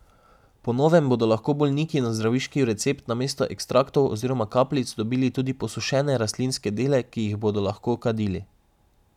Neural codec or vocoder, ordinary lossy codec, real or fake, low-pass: none; none; real; 19.8 kHz